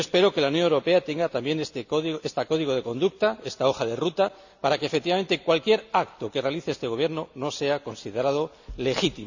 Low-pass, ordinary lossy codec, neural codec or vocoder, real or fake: 7.2 kHz; none; none; real